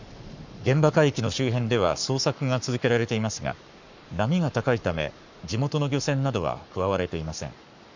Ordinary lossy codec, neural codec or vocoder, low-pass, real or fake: none; codec, 44.1 kHz, 7.8 kbps, Pupu-Codec; 7.2 kHz; fake